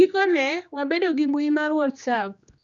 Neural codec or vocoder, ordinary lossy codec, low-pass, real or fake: codec, 16 kHz, 2 kbps, X-Codec, HuBERT features, trained on general audio; Opus, 64 kbps; 7.2 kHz; fake